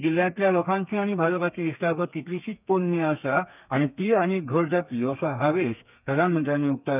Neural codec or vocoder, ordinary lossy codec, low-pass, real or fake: codec, 32 kHz, 1.9 kbps, SNAC; none; 3.6 kHz; fake